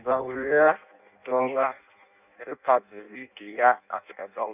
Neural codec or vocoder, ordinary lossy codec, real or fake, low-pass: codec, 16 kHz in and 24 kHz out, 0.6 kbps, FireRedTTS-2 codec; none; fake; 3.6 kHz